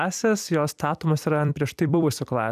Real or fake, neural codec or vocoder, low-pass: fake; vocoder, 44.1 kHz, 128 mel bands every 256 samples, BigVGAN v2; 14.4 kHz